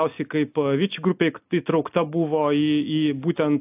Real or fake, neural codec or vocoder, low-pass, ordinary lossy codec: real; none; 3.6 kHz; AAC, 32 kbps